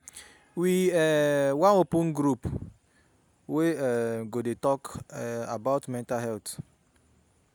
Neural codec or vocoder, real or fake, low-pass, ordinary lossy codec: none; real; none; none